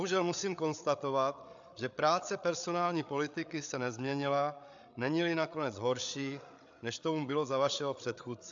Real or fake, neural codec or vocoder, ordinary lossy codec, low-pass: fake; codec, 16 kHz, 8 kbps, FreqCodec, larger model; AAC, 64 kbps; 7.2 kHz